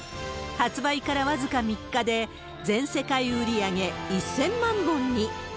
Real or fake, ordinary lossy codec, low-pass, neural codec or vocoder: real; none; none; none